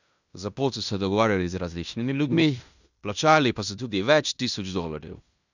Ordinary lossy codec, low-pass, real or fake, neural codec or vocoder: none; 7.2 kHz; fake; codec, 16 kHz in and 24 kHz out, 0.9 kbps, LongCat-Audio-Codec, fine tuned four codebook decoder